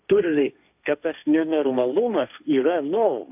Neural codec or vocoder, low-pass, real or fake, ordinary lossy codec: codec, 16 kHz, 1.1 kbps, Voila-Tokenizer; 3.6 kHz; fake; AAC, 32 kbps